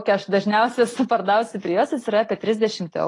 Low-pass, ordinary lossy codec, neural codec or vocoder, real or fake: 10.8 kHz; AAC, 32 kbps; none; real